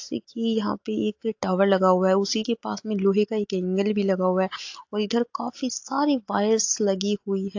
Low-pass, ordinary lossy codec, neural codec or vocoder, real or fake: 7.2 kHz; AAC, 48 kbps; none; real